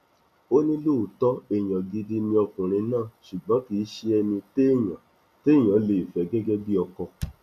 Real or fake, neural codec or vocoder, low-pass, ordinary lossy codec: real; none; 14.4 kHz; none